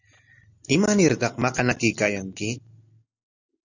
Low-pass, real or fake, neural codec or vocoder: 7.2 kHz; real; none